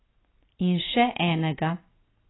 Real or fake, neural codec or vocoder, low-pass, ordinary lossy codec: real; none; 7.2 kHz; AAC, 16 kbps